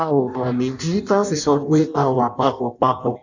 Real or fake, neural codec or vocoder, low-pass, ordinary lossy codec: fake; codec, 16 kHz in and 24 kHz out, 0.6 kbps, FireRedTTS-2 codec; 7.2 kHz; none